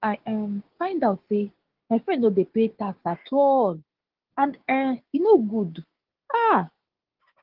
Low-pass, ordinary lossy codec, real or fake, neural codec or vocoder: 5.4 kHz; Opus, 16 kbps; fake; codec, 16 kHz, 6 kbps, DAC